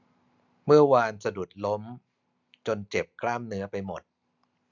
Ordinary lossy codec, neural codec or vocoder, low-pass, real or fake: none; none; 7.2 kHz; real